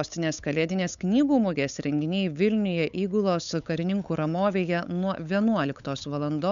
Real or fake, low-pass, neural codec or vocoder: fake; 7.2 kHz; codec, 16 kHz, 8 kbps, FunCodec, trained on LibriTTS, 25 frames a second